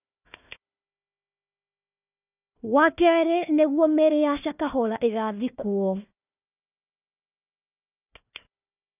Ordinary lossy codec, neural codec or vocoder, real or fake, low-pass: none; codec, 16 kHz, 1 kbps, FunCodec, trained on Chinese and English, 50 frames a second; fake; 3.6 kHz